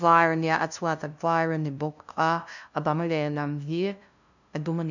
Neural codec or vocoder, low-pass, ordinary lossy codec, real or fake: codec, 16 kHz, 0.5 kbps, FunCodec, trained on LibriTTS, 25 frames a second; 7.2 kHz; none; fake